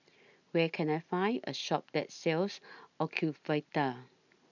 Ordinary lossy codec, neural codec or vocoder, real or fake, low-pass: none; none; real; 7.2 kHz